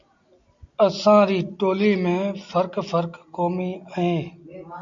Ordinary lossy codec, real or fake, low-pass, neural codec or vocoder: MP3, 64 kbps; real; 7.2 kHz; none